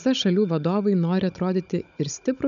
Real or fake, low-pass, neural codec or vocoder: fake; 7.2 kHz; codec, 16 kHz, 16 kbps, FunCodec, trained on Chinese and English, 50 frames a second